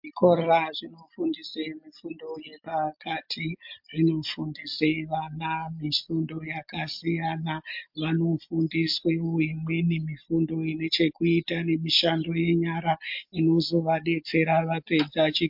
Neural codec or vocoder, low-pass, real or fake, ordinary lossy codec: none; 5.4 kHz; real; MP3, 48 kbps